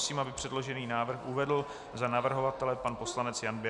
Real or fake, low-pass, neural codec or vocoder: real; 10.8 kHz; none